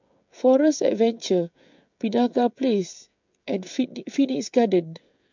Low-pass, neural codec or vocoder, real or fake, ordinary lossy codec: 7.2 kHz; codec, 16 kHz, 16 kbps, FreqCodec, smaller model; fake; MP3, 64 kbps